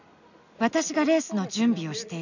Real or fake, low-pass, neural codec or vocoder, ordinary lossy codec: real; 7.2 kHz; none; none